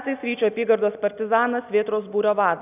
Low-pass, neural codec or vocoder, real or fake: 3.6 kHz; none; real